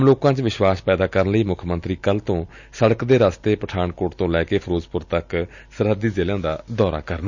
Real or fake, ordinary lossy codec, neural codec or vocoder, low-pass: real; none; none; 7.2 kHz